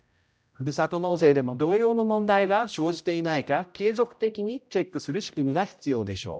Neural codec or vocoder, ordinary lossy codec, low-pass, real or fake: codec, 16 kHz, 0.5 kbps, X-Codec, HuBERT features, trained on general audio; none; none; fake